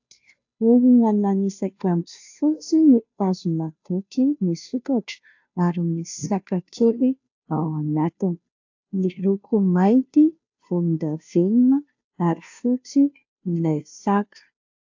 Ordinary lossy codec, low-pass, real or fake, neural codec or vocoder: AAC, 48 kbps; 7.2 kHz; fake; codec, 16 kHz, 0.5 kbps, FunCodec, trained on Chinese and English, 25 frames a second